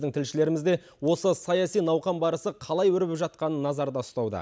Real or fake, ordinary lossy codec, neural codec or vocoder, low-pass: real; none; none; none